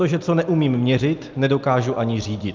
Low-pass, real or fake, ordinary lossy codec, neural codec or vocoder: 7.2 kHz; real; Opus, 24 kbps; none